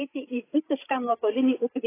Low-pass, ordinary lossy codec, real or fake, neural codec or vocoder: 3.6 kHz; AAC, 16 kbps; fake; codec, 16 kHz, 8 kbps, FreqCodec, larger model